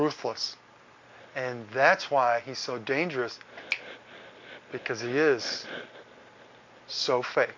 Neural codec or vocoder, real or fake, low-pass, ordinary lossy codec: none; real; 7.2 kHz; MP3, 48 kbps